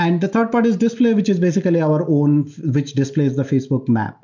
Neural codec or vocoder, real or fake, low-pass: none; real; 7.2 kHz